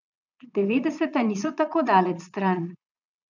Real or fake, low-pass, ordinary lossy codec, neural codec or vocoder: fake; 7.2 kHz; none; vocoder, 22.05 kHz, 80 mel bands, Vocos